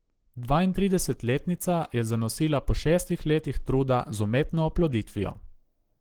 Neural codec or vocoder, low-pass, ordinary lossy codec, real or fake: codec, 44.1 kHz, 7.8 kbps, Pupu-Codec; 19.8 kHz; Opus, 16 kbps; fake